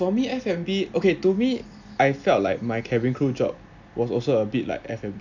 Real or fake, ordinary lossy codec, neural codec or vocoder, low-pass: real; none; none; 7.2 kHz